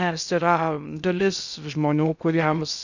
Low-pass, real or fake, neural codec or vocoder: 7.2 kHz; fake; codec, 16 kHz in and 24 kHz out, 0.6 kbps, FocalCodec, streaming, 2048 codes